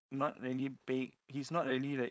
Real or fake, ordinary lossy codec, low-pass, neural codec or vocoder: fake; none; none; codec, 16 kHz, 4.8 kbps, FACodec